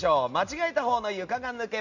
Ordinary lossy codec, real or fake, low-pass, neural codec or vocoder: none; real; 7.2 kHz; none